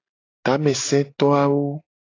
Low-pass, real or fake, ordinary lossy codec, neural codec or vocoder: 7.2 kHz; real; AAC, 32 kbps; none